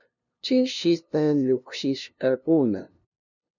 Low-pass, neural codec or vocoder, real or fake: 7.2 kHz; codec, 16 kHz, 0.5 kbps, FunCodec, trained on LibriTTS, 25 frames a second; fake